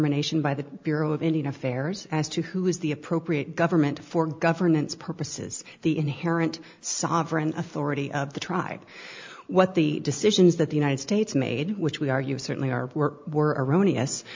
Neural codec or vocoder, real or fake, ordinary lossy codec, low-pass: none; real; MP3, 64 kbps; 7.2 kHz